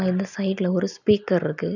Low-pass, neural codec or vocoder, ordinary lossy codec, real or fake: 7.2 kHz; none; none; real